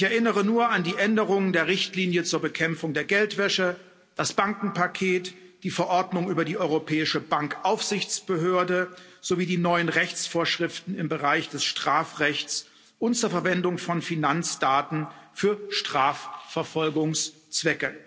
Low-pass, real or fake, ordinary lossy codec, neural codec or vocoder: none; real; none; none